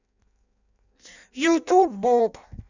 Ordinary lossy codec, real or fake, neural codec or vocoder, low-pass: none; fake; codec, 16 kHz in and 24 kHz out, 0.6 kbps, FireRedTTS-2 codec; 7.2 kHz